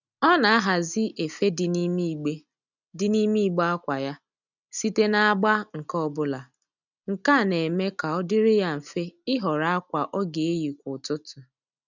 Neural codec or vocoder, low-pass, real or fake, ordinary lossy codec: none; 7.2 kHz; real; none